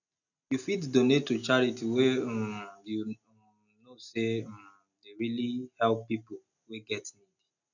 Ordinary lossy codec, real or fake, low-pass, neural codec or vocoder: none; real; 7.2 kHz; none